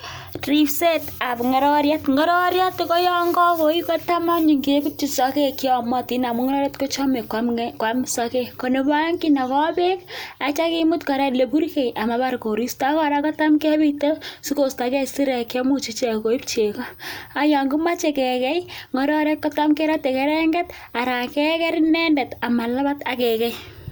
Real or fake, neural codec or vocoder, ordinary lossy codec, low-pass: real; none; none; none